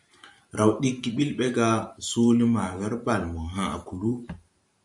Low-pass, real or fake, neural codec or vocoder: 10.8 kHz; fake; vocoder, 44.1 kHz, 128 mel bands every 512 samples, BigVGAN v2